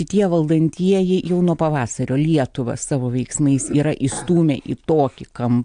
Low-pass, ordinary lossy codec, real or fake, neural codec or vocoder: 9.9 kHz; AAC, 64 kbps; real; none